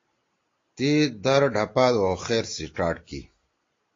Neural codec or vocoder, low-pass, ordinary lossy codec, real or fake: none; 7.2 kHz; AAC, 32 kbps; real